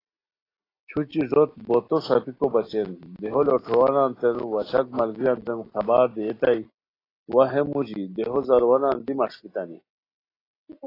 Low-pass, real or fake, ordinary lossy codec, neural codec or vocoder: 5.4 kHz; real; AAC, 24 kbps; none